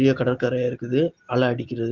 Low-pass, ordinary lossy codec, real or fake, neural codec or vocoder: 7.2 kHz; Opus, 16 kbps; real; none